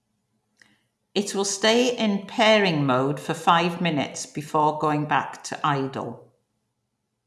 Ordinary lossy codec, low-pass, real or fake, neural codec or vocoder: none; none; real; none